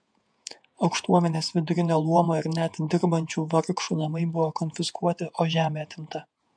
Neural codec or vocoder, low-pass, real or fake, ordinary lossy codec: vocoder, 48 kHz, 128 mel bands, Vocos; 9.9 kHz; fake; MP3, 64 kbps